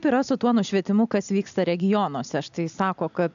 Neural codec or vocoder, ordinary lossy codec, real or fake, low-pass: none; AAC, 96 kbps; real; 7.2 kHz